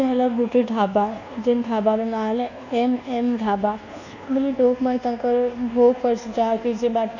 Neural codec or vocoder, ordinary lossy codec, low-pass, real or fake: codec, 24 kHz, 1.2 kbps, DualCodec; none; 7.2 kHz; fake